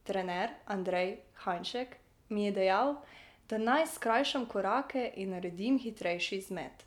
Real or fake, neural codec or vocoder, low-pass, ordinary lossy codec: real; none; 19.8 kHz; none